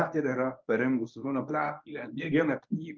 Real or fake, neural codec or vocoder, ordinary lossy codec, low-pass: fake; codec, 24 kHz, 0.9 kbps, WavTokenizer, medium speech release version 1; Opus, 24 kbps; 7.2 kHz